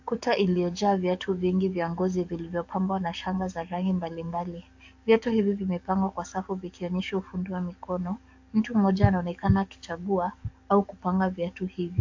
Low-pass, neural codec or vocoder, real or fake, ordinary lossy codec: 7.2 kHz; codec, 44.1 kHz, 7.8 kbps, DAC; fake; AAC, 48 kbps